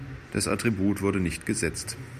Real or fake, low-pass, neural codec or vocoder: real; 14.4 kHz; none